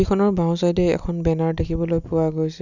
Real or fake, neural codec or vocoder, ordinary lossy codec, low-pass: real; none; none; 7.2 kHz